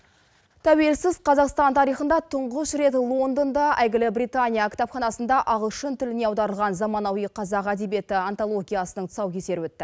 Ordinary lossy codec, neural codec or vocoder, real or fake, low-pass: none; none; real; none